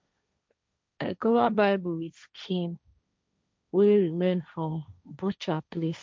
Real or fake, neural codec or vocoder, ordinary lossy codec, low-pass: fake; codec, 16 kHz, 1.1 kbps, Voila-Tokenizer; none; none